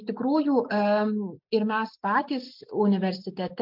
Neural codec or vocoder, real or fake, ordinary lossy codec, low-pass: none; real; MP3, 48 kbps; 5.4 kHz